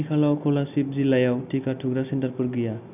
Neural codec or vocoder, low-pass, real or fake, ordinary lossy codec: none; 3.6 kHz; real; none